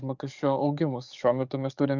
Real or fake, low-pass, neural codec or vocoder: fake; 7.2 kHz; codec, 44.1 kHz, 7.8 kbps, DAC